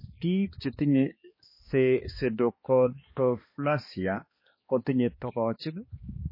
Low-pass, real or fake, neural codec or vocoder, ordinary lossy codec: 5.4 kHz; fake; codec, 16 kHz, 2 kbps, X-Codec, HuBERT features, trained on balanced general audio; MP3, 24 kbps